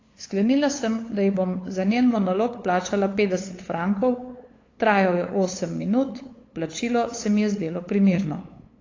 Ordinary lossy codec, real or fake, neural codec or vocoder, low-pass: AAC, 32 kbps; fake; codec, 16 kHz, 8 kbps, FunCodec, trained on LibriTTS, 25 frames a second; 7.2 kHz